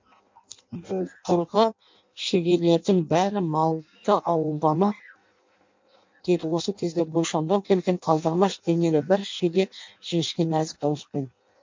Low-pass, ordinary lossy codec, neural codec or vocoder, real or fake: 7.2 kHz; MP3, 48 kbps; codec, 16 kHz in and 24 kHz out, 0.6 kbps, FireRedTTS-2 codec; fake